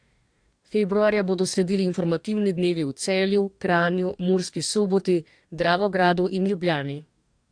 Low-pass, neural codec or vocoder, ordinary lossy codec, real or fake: 9.9 kHz; codec, 44.1 kHz, 2.6 kbps, DAC; AAC, 64 kbps; fake